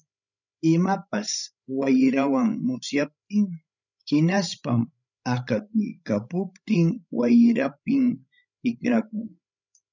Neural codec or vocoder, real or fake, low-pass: codec, 16 kHz, 16 kbps, FreqCodec, larger model; fake; 7.2 kHz